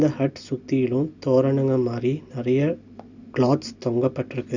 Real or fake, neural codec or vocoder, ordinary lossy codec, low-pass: real; none; none; 7.2 kHz